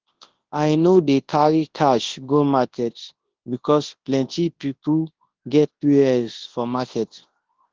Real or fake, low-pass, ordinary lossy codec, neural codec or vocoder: fake; 7.2 kHz; Opus, 16 kbps; codec, 24 kHz, 0.9 kbps, WavTokenizer, large speech release